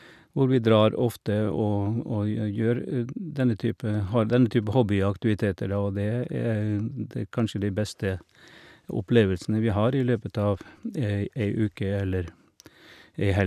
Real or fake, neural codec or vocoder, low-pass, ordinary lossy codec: real; none; 14.4 kHz; none